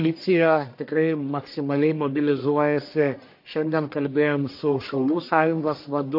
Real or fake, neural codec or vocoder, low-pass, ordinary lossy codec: fake; codec, 44.1 kHz, 1.7 kbps, Pupu-Codec; 5.4 kHz; MP3, 32 kbps